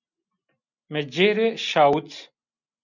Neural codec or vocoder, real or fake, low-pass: none; real; 7.2 kHz